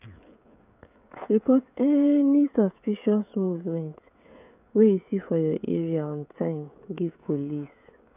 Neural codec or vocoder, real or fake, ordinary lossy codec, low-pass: codec, 16 kHz, 16 kbps, FreqCodec, smaller model; fake; none; 3.6 kHz